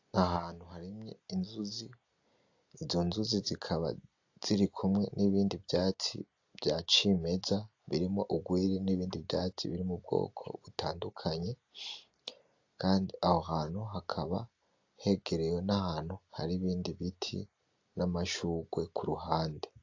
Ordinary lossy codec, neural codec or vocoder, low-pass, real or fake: AAC, 48 kbps; none; 7.2 kHz; real